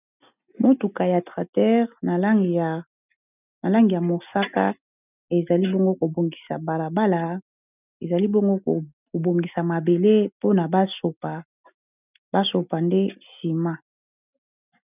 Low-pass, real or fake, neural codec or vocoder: 3.6 kHz; real; none